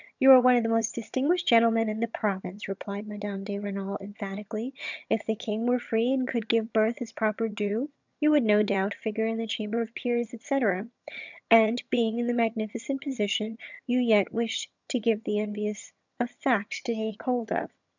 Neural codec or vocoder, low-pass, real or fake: vocoder, 22.05 kHz, 80 mel bands, HiFi-GAN; 7.2 kHz; fake